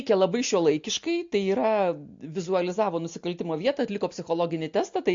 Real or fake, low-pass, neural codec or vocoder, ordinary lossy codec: real; 7.2 kHz; none; MP3, 48 kbps